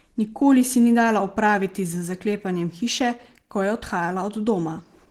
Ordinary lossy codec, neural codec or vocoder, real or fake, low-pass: Opus, 16 kbps; none; real; 19.8 kHz